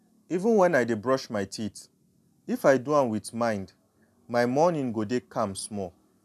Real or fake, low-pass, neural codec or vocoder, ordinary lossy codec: real; 14.4 kHz; none; none